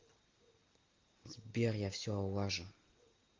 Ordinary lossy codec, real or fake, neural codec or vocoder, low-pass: Opus, 24 kbps; real; none; 7.2 kHz